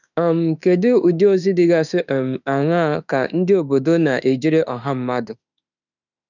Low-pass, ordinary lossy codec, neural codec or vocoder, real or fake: 7.2 kHz; none; autoencoder, 48 kHz, 32 numbers a frame, DAC-VAE, trained on Japanese speech; fake